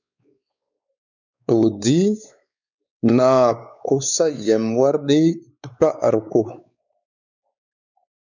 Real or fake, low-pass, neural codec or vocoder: fake; 7.2 kHz; codec, 16 kHz, 4 kbps, X-Codec, WavLM features, trained on Multilingual LibriSpeech